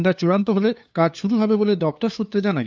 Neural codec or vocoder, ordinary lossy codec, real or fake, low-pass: codec, 16 kHz, 2 kbps, FunCodec, trained on LibriTTS, 25 frames a second; none; fake; none